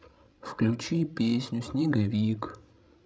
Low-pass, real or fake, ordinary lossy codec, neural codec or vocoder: none; fake; none; codec, 16 kHz, 16 kbps, FreqCodec, larger model